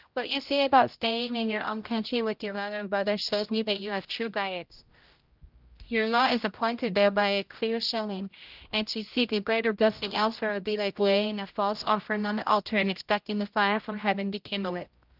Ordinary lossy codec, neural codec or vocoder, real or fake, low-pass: Opus, 24 kbps; codec, 16 kHz, 0.5 kbps, X-Codec, HuBERT features, trained on general audio; fake; 5.4 kHz